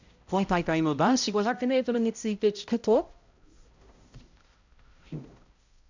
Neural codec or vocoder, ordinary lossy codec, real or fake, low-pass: codec, 16 kHz, 0.5 kbps, X-Codec, HuBERT features, trained on balanced general audio; Opus, 64 kbps; fake; 7.2 kHz